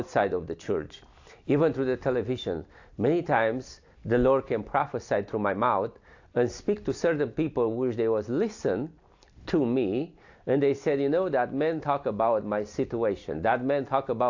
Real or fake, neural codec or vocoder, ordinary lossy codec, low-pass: real; none; AAC, 48 kbps; 7.2 kHz